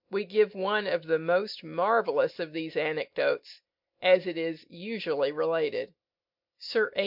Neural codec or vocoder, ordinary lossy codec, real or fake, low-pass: none; MP3, 48 kbps; real; 5.4 kHz